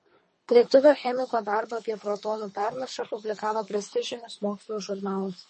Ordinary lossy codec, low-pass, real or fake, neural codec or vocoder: MP3, 32 kbps; 10.8 kHz; fake; codec, 24 kHz, 3 kbps, HILCodec